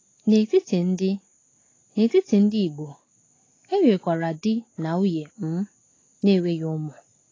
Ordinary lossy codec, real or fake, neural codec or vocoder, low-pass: AAC, 32 kbps; real; none; 7.2 kHz